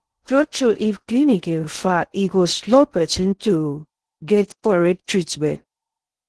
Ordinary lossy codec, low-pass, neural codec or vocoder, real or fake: Opus, 16 kbps; 10.8 kHz; codec, 16 kHz in and 24 kHz out, 0.6 kbps, FocalCodec, streaming, 2048 codes; fake